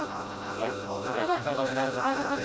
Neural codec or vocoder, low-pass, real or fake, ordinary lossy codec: codec, 16 kHz, 0.5 kbps, FreqCodec, smaller model; none; fake; none